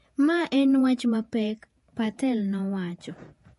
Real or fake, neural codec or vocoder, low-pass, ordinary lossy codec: fake; vocoder, 48 kHz, 128 mel bands, Vocos; 14.4 kHz; MP3, 48 kbps